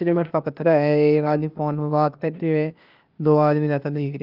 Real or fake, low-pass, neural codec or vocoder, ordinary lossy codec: fake; 5.4 kHz; codec, 16 kHz, 0.8 kbps, ZipCodec; Opus, 32 kbps